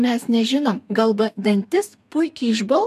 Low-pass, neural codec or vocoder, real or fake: 14.4 kHz; codec, 32 kHz, 1.9 kbps, SNAC; fake